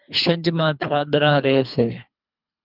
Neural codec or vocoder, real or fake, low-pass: codec, 24 kHz, 3 kbps, HILCodec; fake; 5.4 kHz